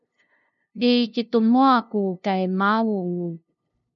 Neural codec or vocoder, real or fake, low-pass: codec, 16 kHz, 0.5 kbps, FunCodec, trained on LibriTTS, 25 frames a second; fake; 7.2 kHz